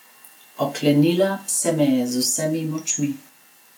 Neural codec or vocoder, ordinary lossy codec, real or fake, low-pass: none; none; real; 19.8 kHz